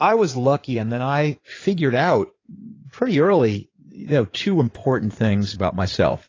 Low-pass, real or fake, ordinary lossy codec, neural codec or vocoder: 7.2 kHz; fake; AAC, 32 kbps; codec, 16 kHz in and 24 kHz out, 2.2 kbps, FireRedTTS-2 codec